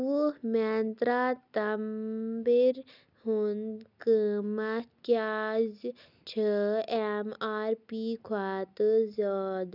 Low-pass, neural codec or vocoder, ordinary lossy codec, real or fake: 5.4 kHz; none; none; real